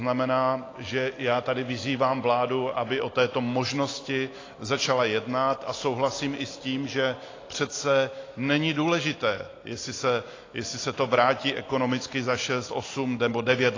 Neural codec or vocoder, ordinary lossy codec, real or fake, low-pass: none; AAC, 32 kbps; real; 7.2 kHz